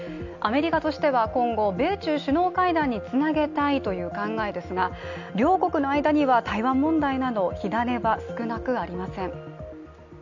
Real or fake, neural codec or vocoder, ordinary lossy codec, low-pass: real; none; none; 7.2 kHz